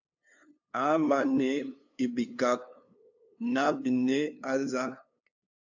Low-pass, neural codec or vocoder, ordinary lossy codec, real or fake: 7.2 kHz; codec, 16 kHz, 2 kbps, FunCodec, trained on LibriTTS, 25 frames a second; AAC, 48 kbps; fake